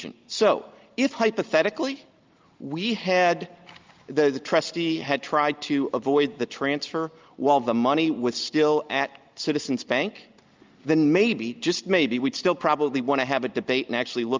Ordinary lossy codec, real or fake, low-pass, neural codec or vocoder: Opus, 24 kbps; real; 7.2 kHz; none